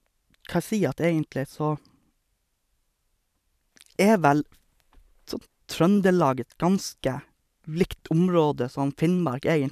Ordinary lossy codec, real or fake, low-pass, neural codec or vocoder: none; fake; 14.4 kHz; vocoder, 44.1 kHz, 128 mel bands every 512 samples, BigVGAN v2